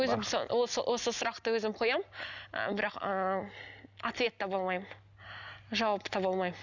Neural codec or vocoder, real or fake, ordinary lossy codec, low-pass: none; real; none; 7.2 kHz